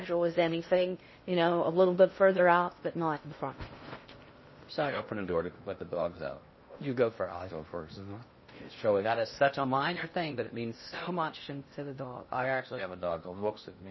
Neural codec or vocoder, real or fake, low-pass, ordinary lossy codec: codec, 16 kHz in and 24 kHz out, 0.6 kbps, FocalCodec, streaming, 4096 codes; fake; 7.2 kHz; MP3, 24 kbps